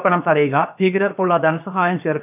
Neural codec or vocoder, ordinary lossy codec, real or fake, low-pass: codec, 16 kHz, about 1 kbps, DyCAST, with the encoder's durations; none; fake; 3.6 kHz